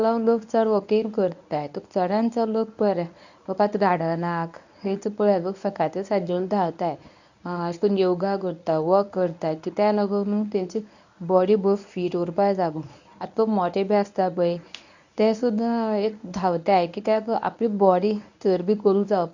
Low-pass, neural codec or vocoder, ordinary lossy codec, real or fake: 7.2 kHz; codec, 24 kHz, 0.9 kbps, WavTokenizer, medium speech release version 1; none; fake